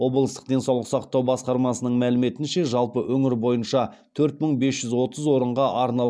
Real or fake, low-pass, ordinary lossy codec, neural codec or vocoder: real; none; none; none